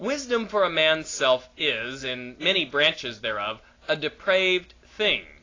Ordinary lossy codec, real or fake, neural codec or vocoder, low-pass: AAC, 32 kbps; real; none; 7.2 kHz